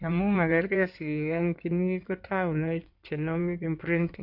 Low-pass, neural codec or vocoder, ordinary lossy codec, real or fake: 5.4 kHz; codec, 16 kHz in and 24 kHz out, 2.2 kbps, FireRedTTS-2 codec; none; fake